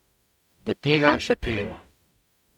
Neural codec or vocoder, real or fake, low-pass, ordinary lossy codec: codec, 44.1 kHz, 0.9 kbps, DAC; fake; 19.8 kHz; none